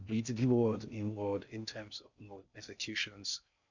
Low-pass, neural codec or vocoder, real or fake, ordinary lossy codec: 7.2 kHz; codec, 16 kHz in and 24 kHz out, 0.6 kbps, FocalCodec, streaming, 2048 codes; fake; none